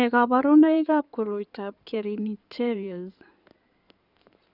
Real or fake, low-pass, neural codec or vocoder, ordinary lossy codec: fake; 5.4 kHz; codec, 16 kHz in and 24 kHz out, 2.2 kbps, FireRedTTS-2 codec; none